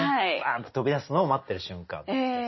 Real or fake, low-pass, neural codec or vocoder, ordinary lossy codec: real; 7.2 kHz; none; MP3, 24 kbps